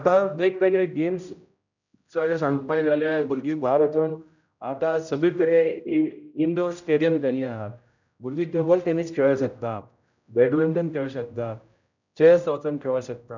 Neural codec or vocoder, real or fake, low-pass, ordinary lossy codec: codec, 16 kHz, 0.5 kbps, X-Codec, HuBERT features, trained on general audio; fake; 7.2 kHz; none